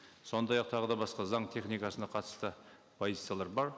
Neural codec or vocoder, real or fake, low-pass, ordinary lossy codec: none; real; none; none